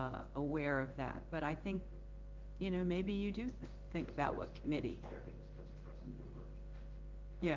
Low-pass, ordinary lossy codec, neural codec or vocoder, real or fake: 7.2 kHz; Opus, 24 kbps; codec, 16 kHz in and 24 kHz out, 1 kbps, XY-Tokenizer; fake